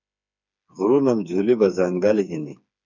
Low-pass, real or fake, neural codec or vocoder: 7.2 kHz; fake; codec, 16 kHz, 4 kbps, FreqCodec, smaller model